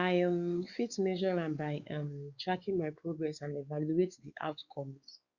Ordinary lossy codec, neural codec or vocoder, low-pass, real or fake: Opus, 64 kbps; codec, 16 kHz, 4 kbps, X-Codec, WavLM features, trained on Multilingual LibriSpeech; 7.2 kHz; fake